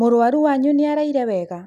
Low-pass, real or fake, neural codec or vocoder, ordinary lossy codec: 14.4 kHz; real; none; none